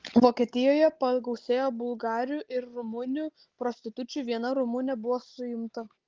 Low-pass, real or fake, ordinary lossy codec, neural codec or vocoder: 7.2 kHz; real; Opus, 16 kbps; none